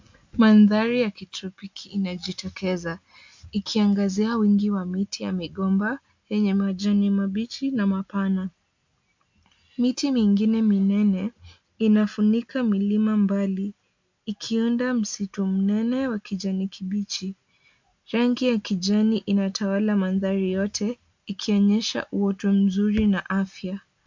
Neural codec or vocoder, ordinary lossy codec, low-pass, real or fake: none; MP3, 64 kbps; 7.2 kHz; real